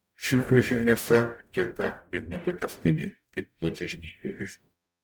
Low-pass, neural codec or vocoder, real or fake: 19.8 kHz; codec, 44.1 kHz, 0.9 kbps, DAC; fake